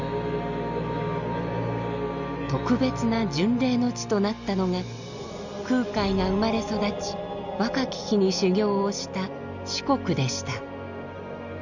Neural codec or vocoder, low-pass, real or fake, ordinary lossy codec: none; 7.2 kHz; real; none